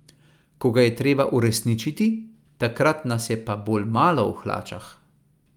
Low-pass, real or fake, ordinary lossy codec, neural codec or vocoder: 19.8 kHz; real; Opus, 32 kbps; none